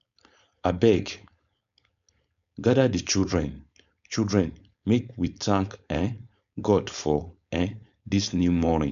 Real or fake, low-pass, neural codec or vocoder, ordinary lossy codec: fake; 7.2 kHz; codec, 16 kHz, 4.8 kbps, FACodec; none